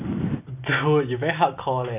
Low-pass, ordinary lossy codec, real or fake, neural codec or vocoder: 3.6 kHz; none; real; none